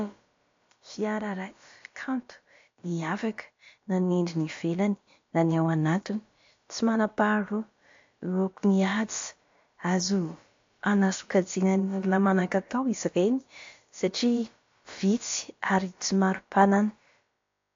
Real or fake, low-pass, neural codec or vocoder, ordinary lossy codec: fake; 7.2 kHz; codec, 16 kHz, about 1 kbps, DyCAST, with the encoder's durations; AAC, 48 kbps